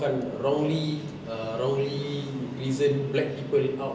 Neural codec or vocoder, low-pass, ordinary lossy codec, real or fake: none; none; none; real